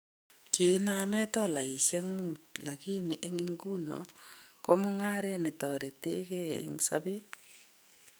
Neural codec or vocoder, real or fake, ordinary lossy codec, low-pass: codec, 44.1 kHz, 2.6 kbps, SNAC; fake; none; none